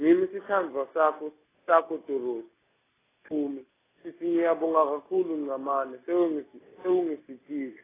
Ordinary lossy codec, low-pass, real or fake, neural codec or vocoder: AAC, 16 kbps; 3.6 kHz; real; none